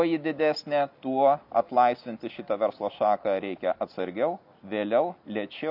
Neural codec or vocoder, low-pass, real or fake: none; 5.4 kHz; real